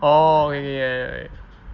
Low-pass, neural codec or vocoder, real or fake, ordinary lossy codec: 7.2 kHz; none; real; Opus, 32 kbps